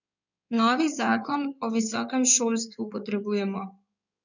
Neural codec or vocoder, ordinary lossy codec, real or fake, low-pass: codec, 16 kHz in and 24 kHz out, 2.2 kbps, FireRedTTS-2 codec; none; fake; 7.2 kHz